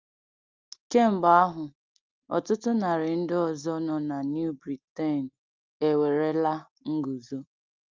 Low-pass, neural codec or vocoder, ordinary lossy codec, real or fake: 7.2 kHz; none; Opus, 32 kbps; real